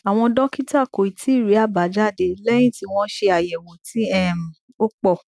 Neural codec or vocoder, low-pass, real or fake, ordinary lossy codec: none; none; real; none